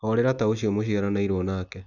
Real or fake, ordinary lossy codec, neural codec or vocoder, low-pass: real; none; none; 7.2 kHz